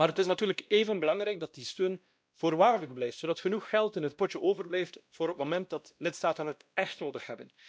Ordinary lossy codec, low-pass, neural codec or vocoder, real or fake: none; none; codec, 16 kHz, 1 kbps, X-Codec, WavLM features, trained on Multilingual LibriSpeech; fake